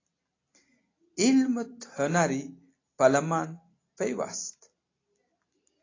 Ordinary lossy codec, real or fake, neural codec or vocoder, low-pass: AAC, 32 kbps; real; none; 7.2 kHz